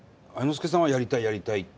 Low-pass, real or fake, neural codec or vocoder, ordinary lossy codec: none; real; none; none